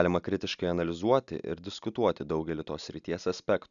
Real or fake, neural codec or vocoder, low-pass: real; none; 7.2 kHz